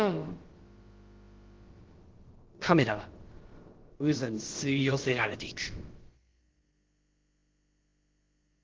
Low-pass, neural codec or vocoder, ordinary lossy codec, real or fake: 7.2 kHz; codec, 16 kHz, about 1 kbps, DyCAST, with the encoder's durations; Opus, 16 kbps; fake